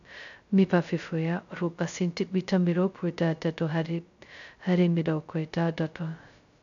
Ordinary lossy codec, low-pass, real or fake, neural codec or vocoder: none; 7.2 kHz; fake; codec, 16 kHz, 0.2 kbps, FocalCodec